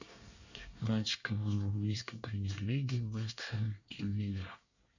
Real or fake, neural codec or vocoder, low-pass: fake; codec, 24 kHz, 1 kbps, SNAC; 7.2 kHz